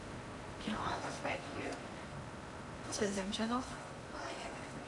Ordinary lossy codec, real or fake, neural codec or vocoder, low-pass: MP3, 64 kbps; fake; codec, 16 kHz in and 24 kHz out, 0.8 kbps, FocalCodec, streaming, 65536 codes; 10.8 kHz